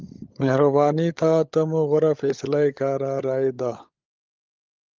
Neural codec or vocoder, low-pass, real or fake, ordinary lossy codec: codec, 16 kHz, 16 kbps, FunCodec, trained on LibriTTS, 50 frames a second; 7.2 kHz; fake; Opus, 32 kbps